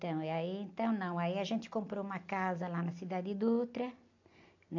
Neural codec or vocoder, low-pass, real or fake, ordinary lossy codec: none; 7.2 kHz; real; AAC, 48 kbps